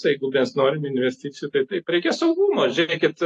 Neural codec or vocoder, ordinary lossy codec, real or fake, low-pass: none; AAC, 48 kbps; real; 10.8 kHz